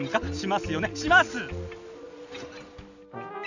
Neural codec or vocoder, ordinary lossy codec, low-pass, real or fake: none; none; 7.2 kHz; real